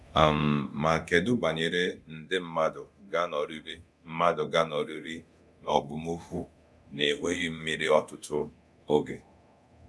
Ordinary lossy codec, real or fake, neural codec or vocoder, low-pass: none; fake; codec, 24 kHz, 0.9 kbps, DualCodec; none